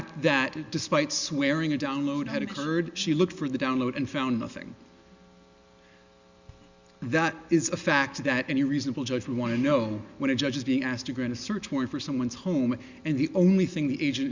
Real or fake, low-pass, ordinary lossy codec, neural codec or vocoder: real; 7.2 kHz; Opus, 64 kbps; none